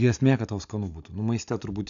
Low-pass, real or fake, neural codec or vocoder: 7.2 kHz; real; none